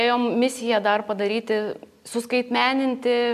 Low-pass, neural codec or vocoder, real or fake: 14.4 kHz; none; real